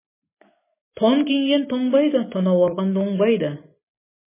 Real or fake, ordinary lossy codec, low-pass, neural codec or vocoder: real; MP3, 16 kbps; 3.6 kHz; none